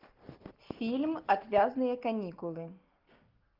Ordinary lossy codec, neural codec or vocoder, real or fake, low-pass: Opus, 32 kbps; none; real; 5.4 kHz